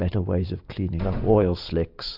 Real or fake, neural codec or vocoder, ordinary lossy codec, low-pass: real; none; AAC, 48 kbps; 5.4 kHz